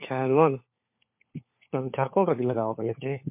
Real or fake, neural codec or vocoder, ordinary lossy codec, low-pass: fake; codec, 16 kHz, 4 kbps, FunCodec, trained on LibriTTS, 50 frames a second; MP3, 32 kbps; 3.6 kHz